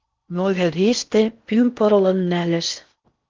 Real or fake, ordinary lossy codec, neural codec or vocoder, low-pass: fake; Opus, 32 kbps; codec, 16 kHz in and 24 kHz out, 0.6 kbps, FocalCodec, streaming, 4096 codes; 7.2 kHz